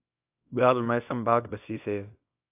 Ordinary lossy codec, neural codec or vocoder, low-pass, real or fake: AAC, 32 kbps; codec, 24 kHz, 0.9 kbps, WavTokenizer, small release; 3.6 kHz; fake